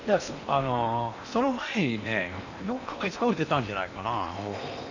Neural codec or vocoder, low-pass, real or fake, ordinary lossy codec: codec, 16 kHz in and 24 kHz out, 0.8 kbps, FocalCodec, streaming, 65536 codes; 7.2 kHz; fake; none